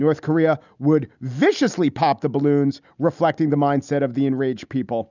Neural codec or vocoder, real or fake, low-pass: none; real; 7.2 kHz